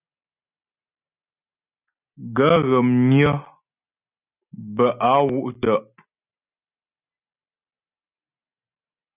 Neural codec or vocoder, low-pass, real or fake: none; 3.6 kHz; real